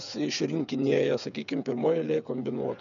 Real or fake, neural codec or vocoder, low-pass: fake; codec, 16 kHz, 8 kbps, FreqCodec, smaller model; 7.2 kHz